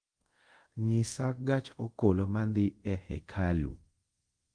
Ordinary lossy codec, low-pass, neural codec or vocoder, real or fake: Opus, 24 kbps; 9.9 kHz; codec, 24 kHz, 0.5 kbps, DualCodec; fake